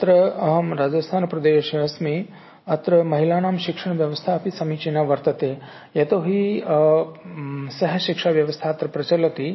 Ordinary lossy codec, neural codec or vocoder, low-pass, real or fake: MP3, 24 kbps; none; 7.2 kHz; real